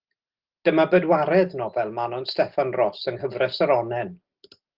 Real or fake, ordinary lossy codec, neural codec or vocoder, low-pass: real; Opus, 16 kbps; none; 5.4 kHz